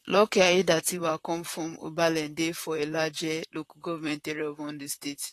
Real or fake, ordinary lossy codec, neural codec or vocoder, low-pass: fake; AAC, 48 kbps; vocoder, 44.1 kHz, 128 mel bands, Pupu-Vocoder; 14.4 kHz